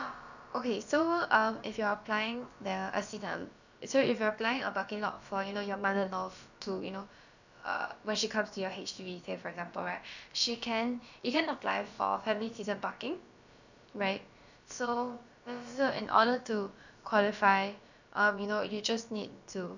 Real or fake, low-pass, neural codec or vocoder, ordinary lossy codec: fake; 7.2 kHz; codec, 16 kHz, about 1 kbps, DyCAST, with the encoder's durations; none